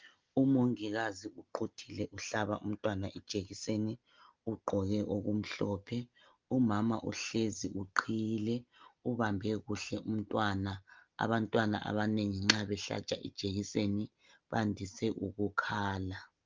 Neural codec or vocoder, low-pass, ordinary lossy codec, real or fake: none; 7.2 kHz; Opus, 32 kbps; real